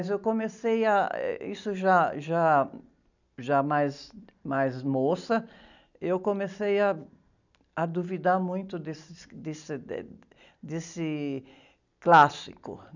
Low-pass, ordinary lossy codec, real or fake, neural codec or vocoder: 7.2 kHz; none; real; none